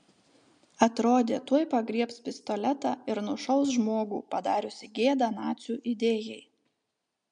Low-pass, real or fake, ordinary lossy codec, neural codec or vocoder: 9.9 kHz; real; MP3, 64 kbps; none